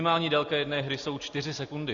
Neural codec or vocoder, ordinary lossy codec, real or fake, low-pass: none; Opus, 64 kbps; real; 7.2 kHz